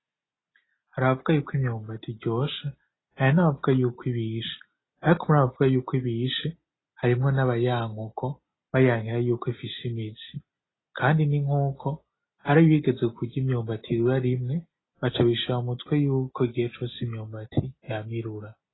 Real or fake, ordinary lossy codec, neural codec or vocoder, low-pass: real; AAC, 16 kbps; none; 7.2 kHz